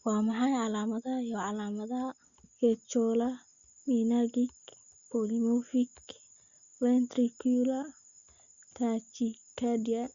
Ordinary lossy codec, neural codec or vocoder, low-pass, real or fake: Opus, 64 kbps; none; 7.2 kHz; real